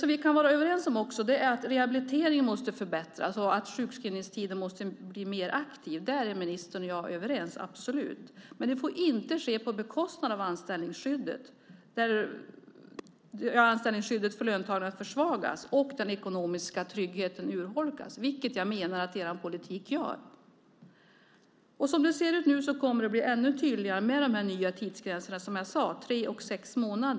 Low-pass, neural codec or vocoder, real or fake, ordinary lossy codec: none; none; real; none